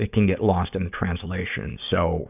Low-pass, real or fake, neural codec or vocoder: 3.6 kHz; fake; codec, 16 kHz, 6 kbps, DAC